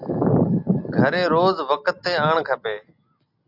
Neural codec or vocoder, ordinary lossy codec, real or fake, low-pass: none; AAC, 48 kbps; real; 5.4 kHz